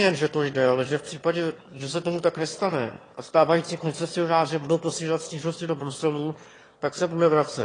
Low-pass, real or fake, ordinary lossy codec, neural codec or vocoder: 9.9 kHz; fake; AAC, 32 kbps; autoencoder, 22.05 kHz, a latent of 192 numbers a frame, VITS, trained on one speaker